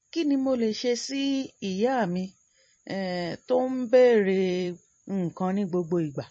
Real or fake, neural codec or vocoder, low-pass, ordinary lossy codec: fake; vocoder, 44.1 kHz, 128 mel bands every 256 samples, BigVGAN v2; 9.9 kHz; MP3, 32 kbps